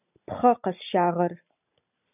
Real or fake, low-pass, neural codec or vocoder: real; 3.6 kHz; none